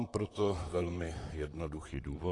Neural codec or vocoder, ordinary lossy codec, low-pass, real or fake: vocoder, 44.1 kHz, 128 mel bands, Pupu-Vocoder; AAC, 32 kbps; 10.8 kHz; fake